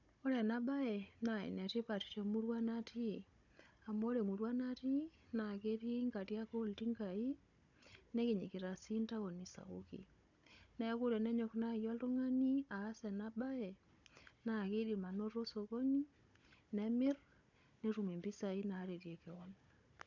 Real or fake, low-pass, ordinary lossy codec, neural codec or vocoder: fake; 7.2 kHz; none; codec, 16 kHz, 16 kbps, FunCodec, trained on Chinese and English, 50 frames a second